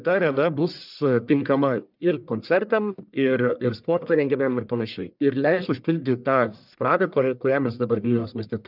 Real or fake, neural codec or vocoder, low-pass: fake; codec, 44.1 kHz, 1.7 kbps, Pupu-Codec; 5.4 kHz